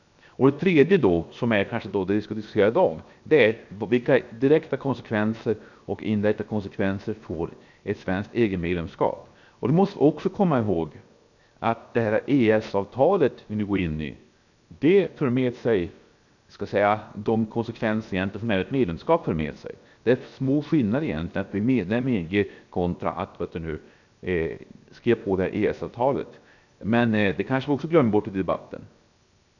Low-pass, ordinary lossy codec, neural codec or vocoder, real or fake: 7.2 kHz; none; codec, 16 kHz, 0.7 kbps, FocalCodec; fake